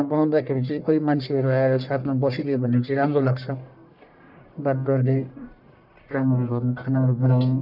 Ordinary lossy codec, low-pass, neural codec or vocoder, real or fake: none; 5.4 kHz; codec, 44.1 kHz, 1.7 kbps, Pupu-Codec; fake